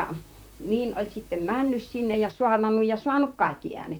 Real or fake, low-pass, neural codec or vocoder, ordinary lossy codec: fake; none; vocoder, 44.1 kHz, 128 mel bands, Pupu-Vocoder; none